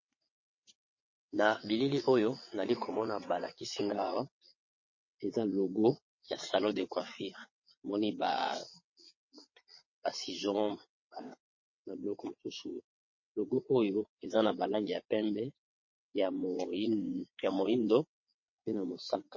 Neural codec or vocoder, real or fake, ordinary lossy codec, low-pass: vocoder, 22.05 kHz, 80 mel bands, WaveNeXt; fake; MP3, 32 kbps; 7.2 kHz